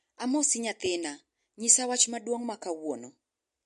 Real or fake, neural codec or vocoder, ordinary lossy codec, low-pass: real; none; MP3, 48 kbps; 14.4 kHz